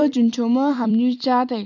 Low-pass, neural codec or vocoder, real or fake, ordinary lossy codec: 7.2 kHz; vocoder, 44.1 kHz, 128 mel bands every 256 samples, BigVGAN v2; fake; none